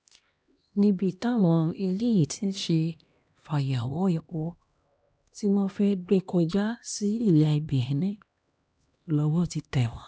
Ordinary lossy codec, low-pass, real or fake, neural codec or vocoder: none; none; fake; codec, 16 kHz, 1 kbps, X-Codec, HuBERT features, trained on LibriSpeech